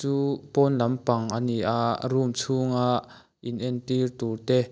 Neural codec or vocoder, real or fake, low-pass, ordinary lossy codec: none; real; none; none